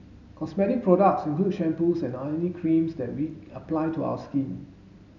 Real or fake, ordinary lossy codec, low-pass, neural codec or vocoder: real; none; 7.2 kHz; none